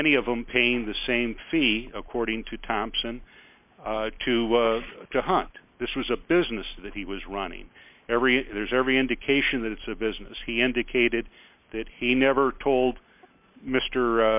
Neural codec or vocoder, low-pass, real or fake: none; 3.6 kHz; real